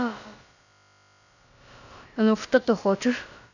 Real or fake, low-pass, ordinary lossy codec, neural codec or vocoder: fake; 7.2 kHz; none; codec, 16 kHz, about 1 kbps, DyCAST, with the encoder's durations